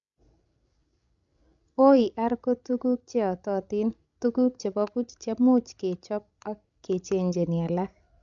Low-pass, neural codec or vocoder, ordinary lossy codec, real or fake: 7.2 kHz; codec, 16 kHz, 16 kbps, FreqCodec, larger model; none; fake